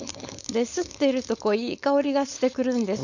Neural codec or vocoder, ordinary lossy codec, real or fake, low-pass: codec, 16 kHz, 4.8 kbps, FACodec; none; fake; 7.2 kHz